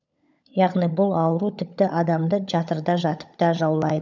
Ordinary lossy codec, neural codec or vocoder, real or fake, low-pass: none; codec, 16 kHz, 16 kbps, FunCodec, trained on LibriTTS, 50 frames a second; fake; 7.2 kHz